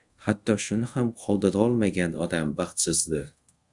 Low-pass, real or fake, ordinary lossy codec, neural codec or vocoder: 10.8 kHz; fake; Opus, 64 kbps; codec, 24 kHz, 0.5 kbps, DualCodec